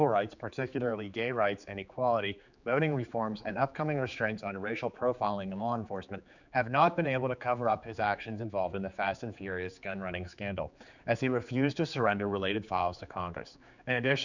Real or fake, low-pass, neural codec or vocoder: fake; 7.2 kHz; codec, 16 kHz, 4 kbps, X-Codec, HuBERT features, trained on general audio